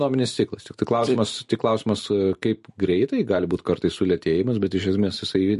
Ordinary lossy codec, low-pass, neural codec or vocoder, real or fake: MP3, 48 kbps; 14.4 kHz; vocoder, 44.1 kHz, 128 mel bands every 256 samples, BigVGAN v2; fake